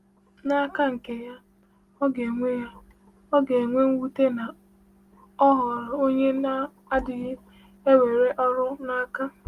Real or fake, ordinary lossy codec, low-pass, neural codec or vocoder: real; Opus, 24 kbps; 14.4 kHz; none